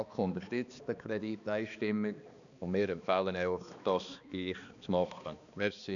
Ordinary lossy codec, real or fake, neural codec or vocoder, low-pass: none; fake; codec, 16 kHz, 2 kbps, X-Codec, HuBERT features, trained on balanced general audio; 7.2 kHz